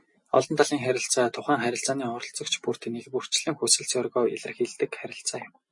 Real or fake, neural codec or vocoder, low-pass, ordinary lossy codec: real; none; 10.8 kHz; MP3, 48 kbps